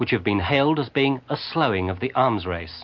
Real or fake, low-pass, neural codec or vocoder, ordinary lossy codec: real; 7.2 kHz; none; MP3, 48 kbps